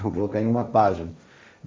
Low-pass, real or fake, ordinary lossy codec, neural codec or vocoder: none; fake; none; codec, 16 kHz, 1.1 kbps, Voila-Tokenizer